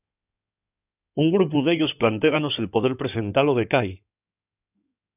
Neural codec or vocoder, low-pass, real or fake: codec, 16 kHz in and 24 kHz out, 2.2 kbps, FireRedTTS-2 codec; 3.6 kHz; fake